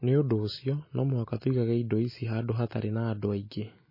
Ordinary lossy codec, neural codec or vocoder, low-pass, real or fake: MP3, 24 kbps; none; 5.4 kHz; real